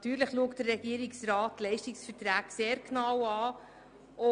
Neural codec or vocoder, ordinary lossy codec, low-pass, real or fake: none; none; 9.9 kHz; real